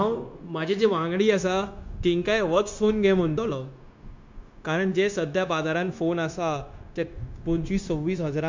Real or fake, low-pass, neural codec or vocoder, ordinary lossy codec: fake; 7.2 kHz; codec, 16 kHz, 0.9 kbps, LongCat-Audio-Codec; MP3, 64 kbps